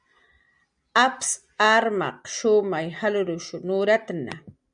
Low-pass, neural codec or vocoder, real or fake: 9.9 kHz; none; real